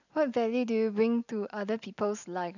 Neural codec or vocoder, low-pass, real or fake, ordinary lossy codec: none; 7.2 kHz; real; none